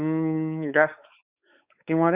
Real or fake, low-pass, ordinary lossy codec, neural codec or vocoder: fake; 3.6 kHz; Opus, 64 kbps; codec, 16 kHz, 4 kbps, X-Codec, WavLM features, trained on Multilingual LibriSpeech